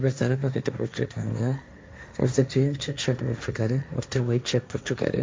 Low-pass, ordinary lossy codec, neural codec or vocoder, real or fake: none; none; codec, 16 kHz, 1.1 kbps, Voila-Tokenizer; fake